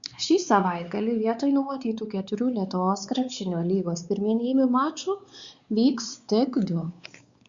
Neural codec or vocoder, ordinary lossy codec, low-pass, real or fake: codec, 16 kHz, 4 kbps, X-Codec, WavLM features, trained on Multilingual LibriSpeech; Opus, 64 kbps; 7.2 kHz; fake